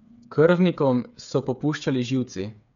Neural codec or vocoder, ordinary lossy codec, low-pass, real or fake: codec, 16 kHz, 8 kbps, FreqCodec, smaller model; none; 7.2 kHz; fake